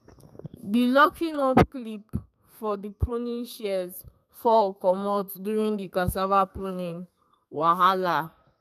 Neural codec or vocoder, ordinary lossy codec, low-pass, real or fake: codec, 32 kHz, 1.9 kbps, SNAC; none; 14.4 kHz; fake